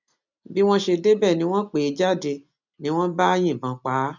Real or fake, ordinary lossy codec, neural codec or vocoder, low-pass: real; none; none; 7.2 kHz